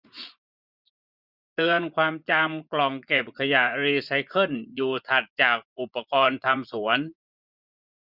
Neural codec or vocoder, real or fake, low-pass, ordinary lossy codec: vocoder, 24 kHz, 100 mel bands, Vocos; fake; 5.4 kHz; none